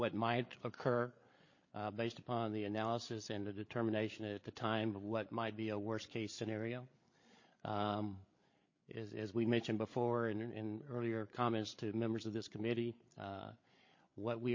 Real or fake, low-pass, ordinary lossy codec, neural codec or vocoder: fake; 7.2 kHz; MP3, 32 kbps; codec, 16 kHz, 8 kbps, FreqCodec, larger model